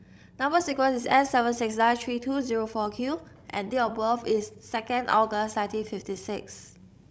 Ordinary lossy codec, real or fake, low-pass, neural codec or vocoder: none; fake; none; codec, 16 kHz, 16 kbps, FreqCodec, larger model